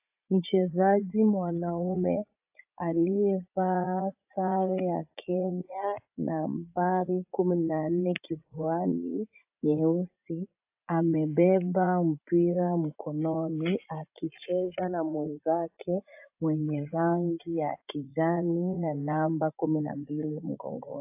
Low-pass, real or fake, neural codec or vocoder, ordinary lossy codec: 3.6 kHz; fake; vocoder, 44.1 kHz, 80 mel bands, Vocos; AAC, 32 kbps